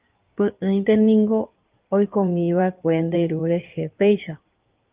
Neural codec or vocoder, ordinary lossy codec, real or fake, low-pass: codec, 16 kHz in and 24 kHz out, 2.2 kbps, FireRedTTS-2 codec; Opus, 24 kbps; fake; 3.6 kHz